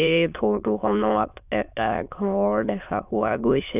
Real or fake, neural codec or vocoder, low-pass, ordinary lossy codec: fake; autoencoder, 22.05 kHz, a latent of 192 numbers a frame, VITS, trained on many speakers; 3.6 kHz; none